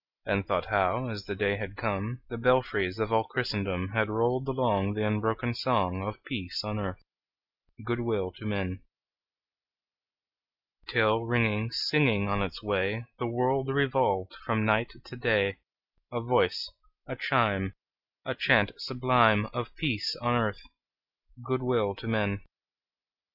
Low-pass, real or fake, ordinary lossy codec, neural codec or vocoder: 5.4 kHz; real; Opus, 64 kbps; none